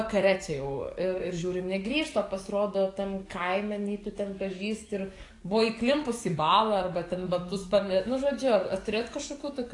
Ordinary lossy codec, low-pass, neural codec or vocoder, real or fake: AAC, 48 kbps; 10.8 kHz; codec, 44.1 kHz, 7.8 kbps, DAC; fake